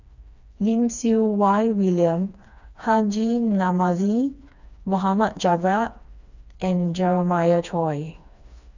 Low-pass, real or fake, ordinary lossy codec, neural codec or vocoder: 7.2 kHz; fake; none; codec, 16 kHz, 2 kbps, FreqCodec, smaller model